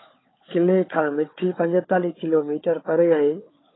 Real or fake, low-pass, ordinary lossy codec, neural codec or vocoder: fake; 7.2 kHz; AAC, 16 kbps; codec, 16 kHz, 4 kbps, FunCodec, trained on Chinese and English, 50 frames a second